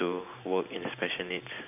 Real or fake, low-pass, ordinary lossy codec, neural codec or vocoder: fake; 3.6 kHz; none; vocoder, 44.1 kHz, 128 mel bands every 512 samples, BigVGAN v2